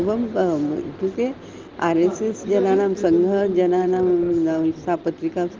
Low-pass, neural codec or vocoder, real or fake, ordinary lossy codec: 7.2 kHz; none; real; Opus, 16 kbps